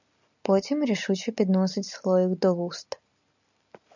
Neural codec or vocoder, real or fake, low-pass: none; real; 7.2 kHz